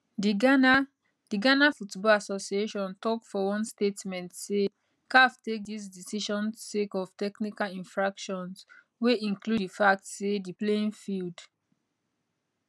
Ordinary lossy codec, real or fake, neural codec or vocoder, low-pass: none; real; none; none